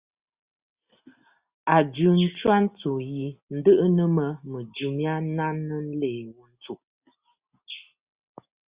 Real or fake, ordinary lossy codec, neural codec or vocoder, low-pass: real; Opus, 64 kbps; none; 3.6 kHz